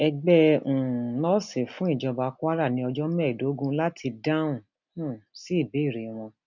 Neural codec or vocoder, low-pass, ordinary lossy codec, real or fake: none; 7.2 kHz; none; real